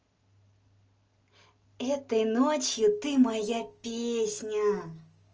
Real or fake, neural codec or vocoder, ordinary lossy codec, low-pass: real; none; Opus, 24 kbps; 7.2 kHz